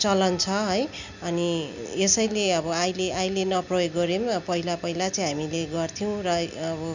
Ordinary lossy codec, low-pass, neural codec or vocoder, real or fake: none; 7.2 kHz; none; real